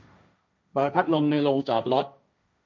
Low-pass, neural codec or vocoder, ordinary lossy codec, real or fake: none; codec, 16 kHz, 1.1 kbps, Voila-Tokenizer; none; fake